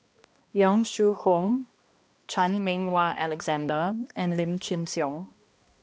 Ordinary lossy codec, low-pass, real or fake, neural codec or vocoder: none; none; fake; codec, 16 kHz, 1 kbps, X-Codec, HuBERT features, trained on balanced general audio